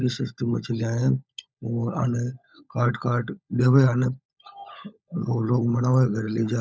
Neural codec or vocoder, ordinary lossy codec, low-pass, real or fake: codec, 16 kHz, 16 kbps, FunCodec, trained on LibriTTS, 50 frames a second; none; none; fake